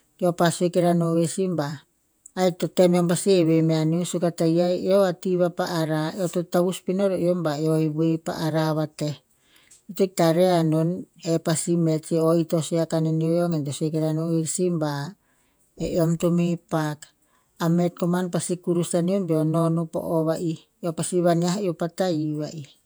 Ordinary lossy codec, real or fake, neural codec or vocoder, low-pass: none; fake; vocoder, 48 kHz, 128 mel bands, Vocos; none